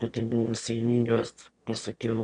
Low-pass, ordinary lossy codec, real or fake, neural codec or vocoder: 9.9 kHz; Opus, 64 kbps; fake; autoencoder, 22.05 kHz, a latent of 192 numbers a frame, VITS, trained on one speaker